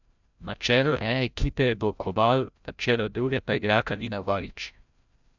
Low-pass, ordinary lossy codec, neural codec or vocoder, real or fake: 7.2 kHz; none; codec, 16 kHz, 0.5 kbps, FreqCodec, larger model; fake